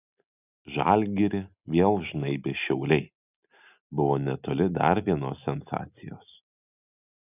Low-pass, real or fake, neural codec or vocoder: 3.6 kHz; real; none